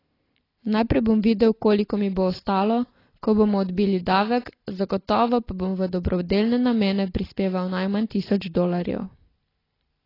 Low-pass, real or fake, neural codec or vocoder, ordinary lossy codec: 5.4 kHz; real; none; AAC, 24 kbps